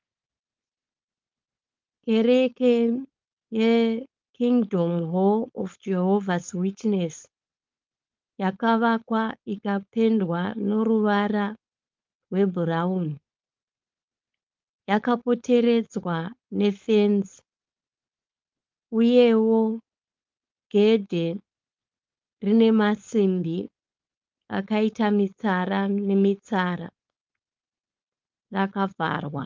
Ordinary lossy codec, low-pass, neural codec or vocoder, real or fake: Opus, 32 kbps; 7.2 kHz; codec, 16 kHz, 4.8 kbps, FACodec; fake